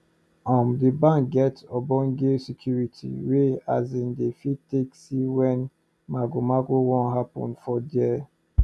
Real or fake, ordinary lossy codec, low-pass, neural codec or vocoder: real; none; none; none